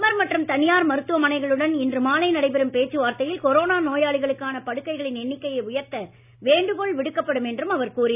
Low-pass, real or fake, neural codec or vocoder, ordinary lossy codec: 3.6 kHz; real; none; none